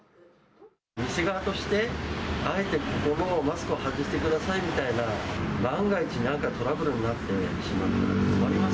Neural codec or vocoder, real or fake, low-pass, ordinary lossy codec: none; real; none; none